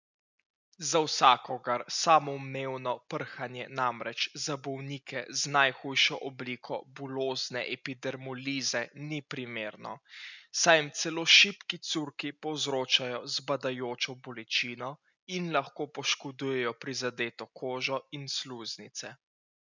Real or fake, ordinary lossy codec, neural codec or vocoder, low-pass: real; none; none; 7.2 kHz